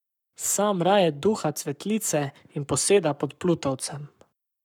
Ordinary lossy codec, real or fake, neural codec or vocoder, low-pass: none; fake; codec, 44.1 kHz, 7.8 kbps, Pupu-Codec; 19.8 kHz